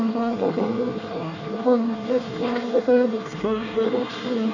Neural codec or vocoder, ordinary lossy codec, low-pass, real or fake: codec, 24 kHz, 1 kbps, SNAC; none; 7.2 kHz; fake